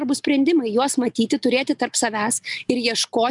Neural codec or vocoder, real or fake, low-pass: none; real; 10.8 kHz